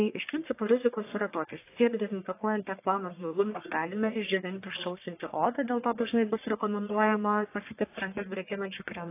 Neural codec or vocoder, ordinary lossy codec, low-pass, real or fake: codec, 44.1 kHz, 1.7 kbps, Pupu-Codec; AAC, 24 kbps; 3.6 kHz; fake